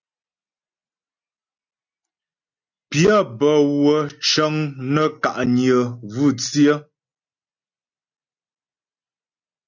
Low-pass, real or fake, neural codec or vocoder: 7.2 kHz; real; none